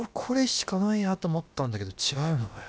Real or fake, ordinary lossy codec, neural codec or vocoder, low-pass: fake; none; codec, 16 kHz, about 1 kbps, DyCAST, with the encoder's durations; none